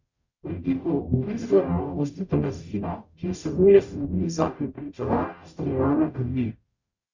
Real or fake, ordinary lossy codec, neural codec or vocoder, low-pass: fake; Opus, 64 kbps; codec, 44.1 kHz, 0.9 kbps, DAC; 7.2 kHz